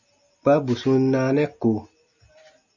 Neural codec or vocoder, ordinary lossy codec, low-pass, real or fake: none; Opus, 64 kbps; 7.2 kHz; real